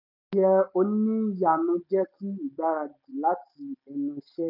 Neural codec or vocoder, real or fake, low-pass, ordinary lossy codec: none; real; 5.4 kHz; none